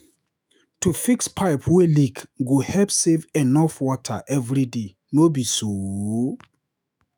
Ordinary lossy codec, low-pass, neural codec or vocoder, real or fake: none; none; autoencoder, 48 kHz, 128 numbers a frame, DAC-VAE, trained on Japanese speech; fake